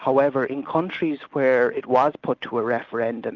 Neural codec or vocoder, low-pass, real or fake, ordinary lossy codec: none; 7.2 kHz; real; Opus, 32 kbps